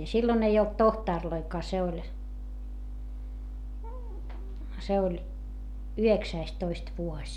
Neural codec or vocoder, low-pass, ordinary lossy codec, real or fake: none; 19.8 kHz; MP3, 96 kbps; real